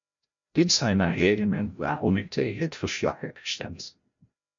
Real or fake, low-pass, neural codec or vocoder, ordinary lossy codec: fake; 7.2 kHz; codec, 16 kHz, 0.5 kbps, FreqCodec, larger model; MP3, 48 kbps